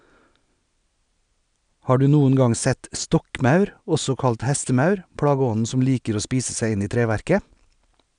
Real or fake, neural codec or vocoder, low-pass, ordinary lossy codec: real; none; 9.9 kHz; none